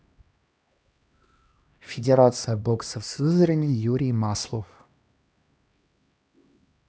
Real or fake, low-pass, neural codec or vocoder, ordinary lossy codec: fake; none; codec, 16 kHz, 1 kbps, X-Codec, HuBERT features, trained on LibriSpeech; none